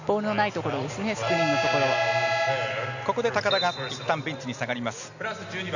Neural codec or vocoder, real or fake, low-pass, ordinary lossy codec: none; real; 7.2 kHz; none